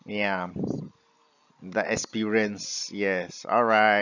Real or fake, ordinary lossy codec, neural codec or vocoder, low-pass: real; none; none; 7.2 kHz